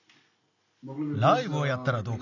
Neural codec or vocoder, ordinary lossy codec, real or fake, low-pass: none; none; real; 7.2 kHz